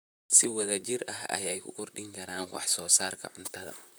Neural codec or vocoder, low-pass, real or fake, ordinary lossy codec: vocoder, 44.1 kHz, 128 mel bands, Pupu-Vocoder; none; fake; none